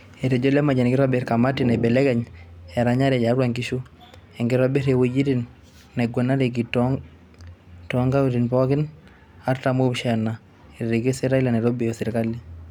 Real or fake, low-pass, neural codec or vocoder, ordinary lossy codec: real; 19.8 kHz; none; none